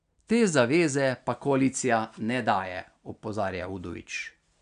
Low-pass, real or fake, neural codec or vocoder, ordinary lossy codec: 9.9 kHz; real; none; none